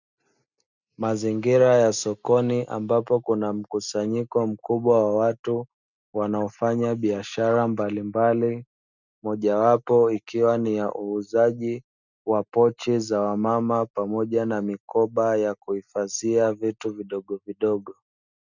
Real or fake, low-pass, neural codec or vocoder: real; 7.2 kHz; none